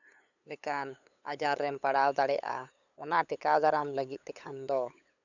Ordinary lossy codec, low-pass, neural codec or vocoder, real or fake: none; 7.2 kHz; codec, 16 kHz, 8 kbps, FunCodec, trained on LibriTTS, 25 frames a second; fake